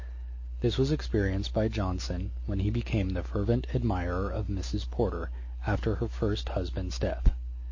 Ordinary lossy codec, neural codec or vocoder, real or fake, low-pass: MP3, 32 kbps; none; real; 7.2 kHz